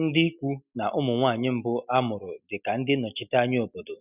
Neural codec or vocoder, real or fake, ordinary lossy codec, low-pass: none; real; none; 3.6 kHz